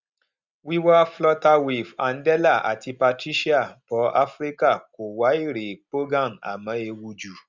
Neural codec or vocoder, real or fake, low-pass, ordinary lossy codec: none; real; 7.2 kHz; Opus, 64 kbps